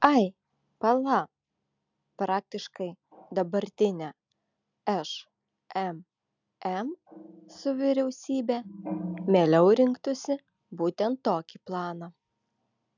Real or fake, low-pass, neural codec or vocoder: real; 7.2 kHz; none